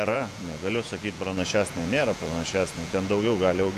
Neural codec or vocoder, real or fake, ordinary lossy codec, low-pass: vocoder, 48 kHz, 128 mel bands, Vocos; fake; MP3, 96 kbps; 14.4 kHz